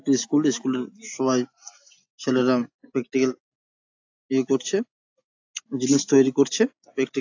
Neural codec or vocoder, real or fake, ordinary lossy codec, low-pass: none; real; AAC, 48 kbps; 7.2 kHz